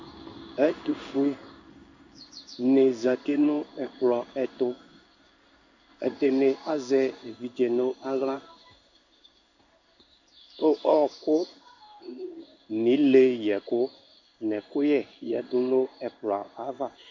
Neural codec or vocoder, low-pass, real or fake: codec, 16 kHz in and 24 kHz out, 1 kbps, XY-Tokenizer; 7.2 kHz; fake